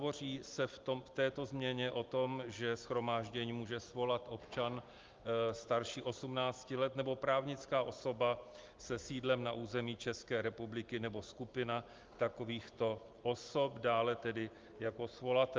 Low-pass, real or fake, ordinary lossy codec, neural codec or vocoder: 7.2 kHz; real; Opus, 32 kbps; none